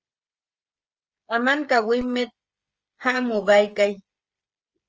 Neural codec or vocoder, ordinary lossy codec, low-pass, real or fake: codec, 16 kHz, 16 kbps, FreqCodec, smaller model; Opus, 24 kbps; 7.2 kHz; fake